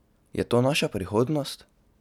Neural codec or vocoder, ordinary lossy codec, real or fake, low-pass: none; none; real; 19.8 kHz